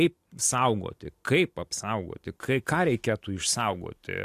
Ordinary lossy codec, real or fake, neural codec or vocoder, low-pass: AAC, 64 kbps; real; none; 14.4 kHz